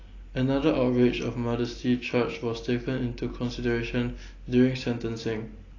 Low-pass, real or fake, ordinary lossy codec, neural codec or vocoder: 7.2 kHz; real; AAC, 32 kbps; none